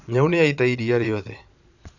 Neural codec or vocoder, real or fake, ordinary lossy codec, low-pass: vocoder, 22.05 kHz, 80 mel bands, Vocos; fake; none; 7.2 kHz